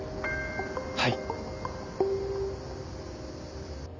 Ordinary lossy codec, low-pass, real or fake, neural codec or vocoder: Opus, 32 kbps; 7.2 kHz; real; none